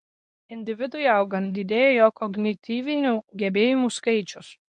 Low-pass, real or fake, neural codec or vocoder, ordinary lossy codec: 10.8 kHz; fake; codec, 24 kHz, 0.9 kbps, WavTokenizer, medium speech release version 1; MP3, 64 kbps